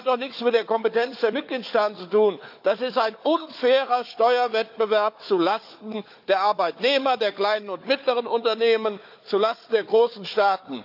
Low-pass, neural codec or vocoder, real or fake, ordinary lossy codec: 5.4 kHz; codec, 16 kHz, 4 kbps, FunCodec, trained on Chinese and English, 50 frames a second; fake; AAC, 48 kbps